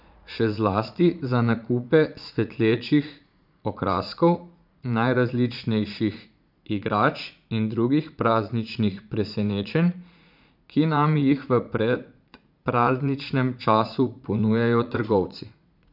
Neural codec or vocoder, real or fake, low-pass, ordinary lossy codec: vocoder, 44.1 kHz, 80 mel bands, Vocos; fake; 5.4 kHz; none